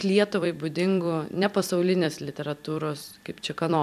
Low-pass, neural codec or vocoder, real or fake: 14.4 kHz; vocoder, 44.1 kHz, 128 mel bands every 256 samples, BigVGAN v2; fake